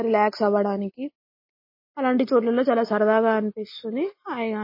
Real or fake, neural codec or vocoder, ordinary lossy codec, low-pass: real; none; MP3, 24 kbps; 5.4 kHz